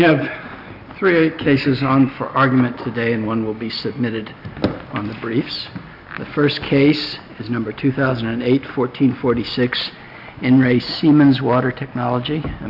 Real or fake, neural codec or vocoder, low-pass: real; none; 5.4 kHz